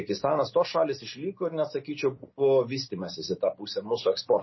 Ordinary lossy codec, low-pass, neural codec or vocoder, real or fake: MP3, 24 kbps; 7.2 kHz; none; real